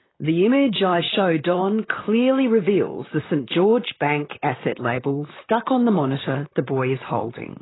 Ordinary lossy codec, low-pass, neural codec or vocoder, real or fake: AAC, 16 kbps; 7.2 kHz; vocoder, 44.1 kHz, 128 mel bands, Pupu-Vocoder; fake